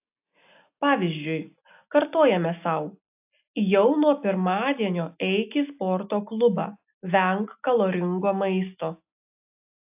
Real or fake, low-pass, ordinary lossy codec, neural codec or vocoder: real; 3.6 kHz; AAC, 32 kbps; none